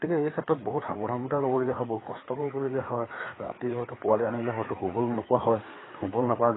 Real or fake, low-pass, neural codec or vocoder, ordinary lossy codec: fake; 7.2 kHz; codec, 16 kHz, 4 kbps, FreqCodec, larger model; AAC, 16 kbps